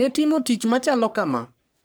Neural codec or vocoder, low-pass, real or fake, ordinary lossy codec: codec, 44.1 kHz, 3.4 kbps, Pupu-Codec; none; fake; none